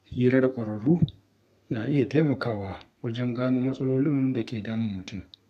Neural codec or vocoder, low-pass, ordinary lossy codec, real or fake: codec, 32 kHz, 1.9 kbps, SNAC; 14.4 kHz; none; fake